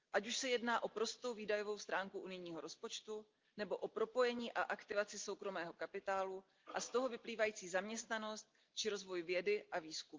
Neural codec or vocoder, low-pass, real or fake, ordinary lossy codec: none; 7.2 kHz; real; Opus, 32 kbps